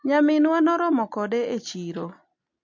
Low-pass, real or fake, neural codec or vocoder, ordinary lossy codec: 7.2 kHz; real; none; MP3, 48 kbps